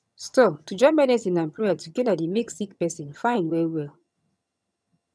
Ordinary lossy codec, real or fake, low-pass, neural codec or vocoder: none; fake; none; vocoder, 22.05 kHz, 80 mel bands, HiFi-GAN